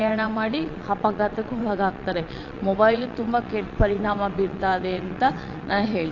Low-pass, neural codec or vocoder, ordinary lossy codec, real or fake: 7.2 kHz; vocoder, 22.05 kHz, 80 mel bands, WaveNeXt; AAC, 48 kbps; fake